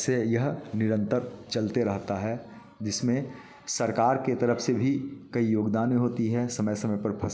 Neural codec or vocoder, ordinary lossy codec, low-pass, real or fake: none; none; none; real